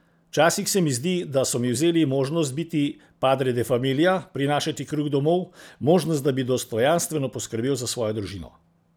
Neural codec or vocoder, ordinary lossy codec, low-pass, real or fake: none; none; none; real